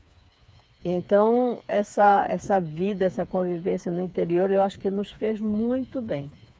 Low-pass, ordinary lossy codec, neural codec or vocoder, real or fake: none; none; codec, 16 kHz, 4 kbps, FreqCodec, smaller model; fake